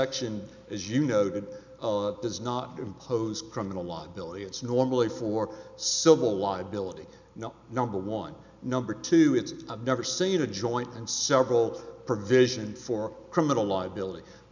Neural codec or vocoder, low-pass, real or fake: none; 7.2 kHz; real